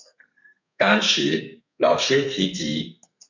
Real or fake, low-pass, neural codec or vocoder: fake; 7.2 kHz; codec, 32 kHz, 1.9 kbps, SNAC